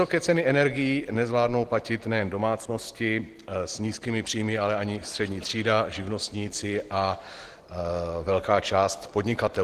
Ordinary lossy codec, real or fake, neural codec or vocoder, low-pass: Opus, 16 kbps; real; none; 14.4 kHz